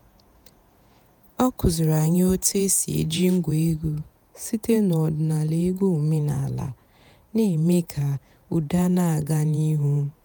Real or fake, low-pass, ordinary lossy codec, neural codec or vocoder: fake; none; none; vocoder, 48 kHz, 128 mel bands, Vocos